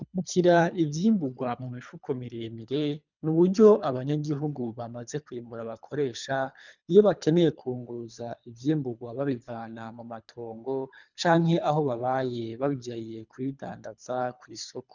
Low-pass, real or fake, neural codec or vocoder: 7.2 kHz; fake; codec, 24 kHz, 3 kbps, HILCodec